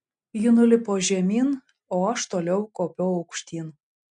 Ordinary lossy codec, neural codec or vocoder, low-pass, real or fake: MP3, 64 kbps; none; 9.9 kHz; real